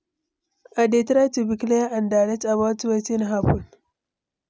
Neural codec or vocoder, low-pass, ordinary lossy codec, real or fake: none; none; none; real